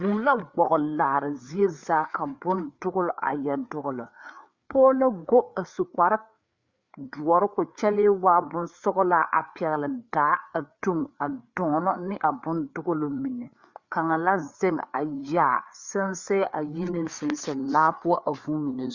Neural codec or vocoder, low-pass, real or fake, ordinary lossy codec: codec, 16 kHz, 4 kbps, FreqCodec, larger model; 7.2 kHz; fake; Opus, 64 kbps